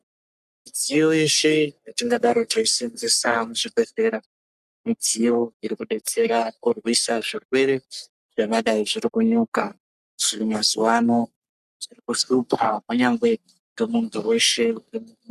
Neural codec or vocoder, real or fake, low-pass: codec, 44.1 kHz, 3.4 kbps, Pupu-Codec; fake; 14.4 kHz